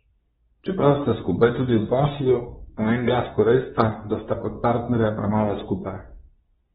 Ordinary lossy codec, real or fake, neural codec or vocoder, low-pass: AAC, 16 kbps; fake; codec, 24 kHz, 0.9 kbps, WavTokenizer, medium speech release version 2; 10.8 kHz